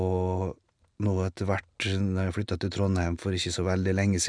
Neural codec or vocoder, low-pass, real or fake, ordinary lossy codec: none; 9.9 kHz; real; none